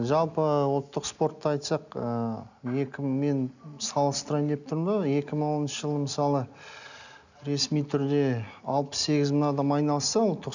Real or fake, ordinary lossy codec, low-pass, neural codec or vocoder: real; none; 7.2 kHz; none